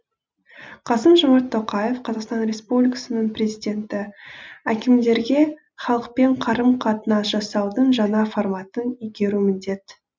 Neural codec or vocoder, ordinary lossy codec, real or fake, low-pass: none; none; real; none